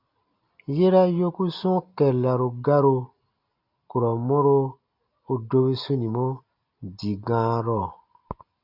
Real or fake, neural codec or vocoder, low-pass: real; none; 5.4 kHz